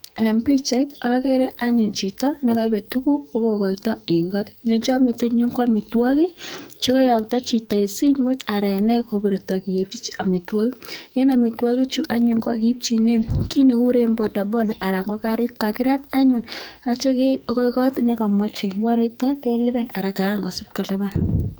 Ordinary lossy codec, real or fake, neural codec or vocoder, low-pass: none; fake; codec, 44.1 kHz, 2.6 kbps, SNAC; none